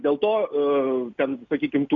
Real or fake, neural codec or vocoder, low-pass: real; none; 7.2 kHz